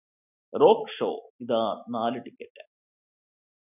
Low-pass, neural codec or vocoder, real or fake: 3.6 kHz; none; real